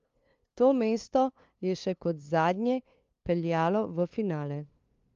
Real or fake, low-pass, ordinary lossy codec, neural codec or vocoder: fake; 7.2 kHz; Opus, 32 kbps; codec, 16 kHz, 2 kbps, FunCodec, trained on LibriTTS, 25 frames a second